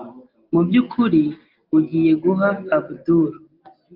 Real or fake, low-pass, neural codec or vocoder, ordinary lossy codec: real; 5.4 kHz; none; Opus, 32 kbps